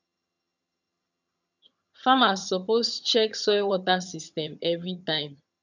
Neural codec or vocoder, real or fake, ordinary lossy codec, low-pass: vocoder, 22.05 kHz, 80 mel bands, HiFi-GAN; fake; none; 7.2 kHz